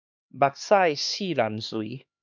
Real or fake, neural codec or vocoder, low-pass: fake; codec, 16 kHz, 2 kbps, X-Codec, HuBERT features, trained on LibriSpeech; 7.2 kHz